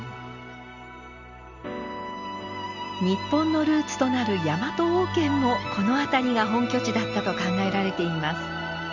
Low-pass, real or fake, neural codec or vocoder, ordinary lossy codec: 7.2 kHz; real; none; Opus, 64 kbps